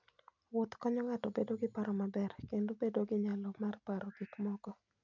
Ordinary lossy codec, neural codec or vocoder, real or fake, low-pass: none; none; real; 7.2 kHz